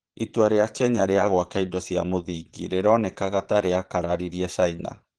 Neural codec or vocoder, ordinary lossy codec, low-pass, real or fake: vocoder, 22.05 kHz, 80 mel bands, Vocos; Opus, 16 kbps; 9.9 kHz; fake